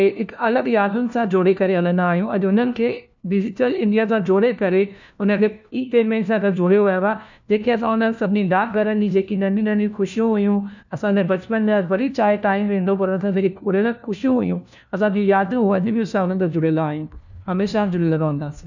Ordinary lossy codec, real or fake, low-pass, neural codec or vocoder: none; fake; 7.2 kHz; codec, 16 kHz, 1 kbps, FunCodec, trained on LibriTTS, 50 frames a second